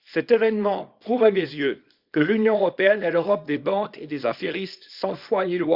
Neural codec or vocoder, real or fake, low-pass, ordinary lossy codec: codec, 24 kHz, 0.9 kbps, WavTokenizer, small release; fake; 5.4 kHz; Opus, 64 kbps